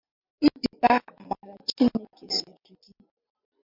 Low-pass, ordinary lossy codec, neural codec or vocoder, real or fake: 5.4 kHz; MP3, 48 kbps; none; real